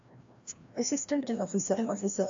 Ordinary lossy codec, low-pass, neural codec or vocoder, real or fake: AAC, 64 kbps; 7.2 kHz; codec, 16 kHz, 1 kbps, FreqCodec, larger model; fake